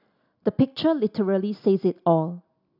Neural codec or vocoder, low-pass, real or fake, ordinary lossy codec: none; 5.4 kHz; real; none